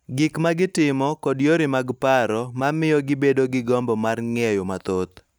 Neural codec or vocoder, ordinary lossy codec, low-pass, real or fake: none; none; none; real